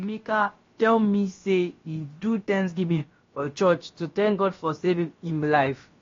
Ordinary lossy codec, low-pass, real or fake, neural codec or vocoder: AAC, 32 kbps; 7.2 kHz; fake; codec, 16 kHz, about 1 kbps, DyCAST, with the encoder's durations